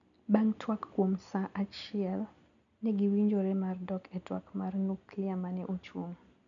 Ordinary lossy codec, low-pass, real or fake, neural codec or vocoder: none; 7.2 kHz; real; none